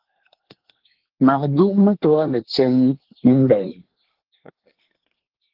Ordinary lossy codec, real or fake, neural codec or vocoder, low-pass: Opus, 16 kbps; fake; codec, 24 kHz, 1 kbps, SNAC; 5.4 kHz